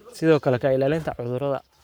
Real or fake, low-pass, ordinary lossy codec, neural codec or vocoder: fake; none; none; codec, 44.1 kHz, 7.8 kbps, Pupu-Codec